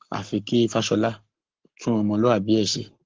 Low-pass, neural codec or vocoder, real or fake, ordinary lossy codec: 7.2 kHz; codec, 44.1 kHz, 7.8 kbps, Pupu-Codec; fake; Opus, 16 kbps